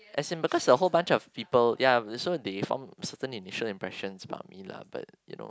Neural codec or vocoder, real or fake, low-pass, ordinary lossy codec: none; real; none; none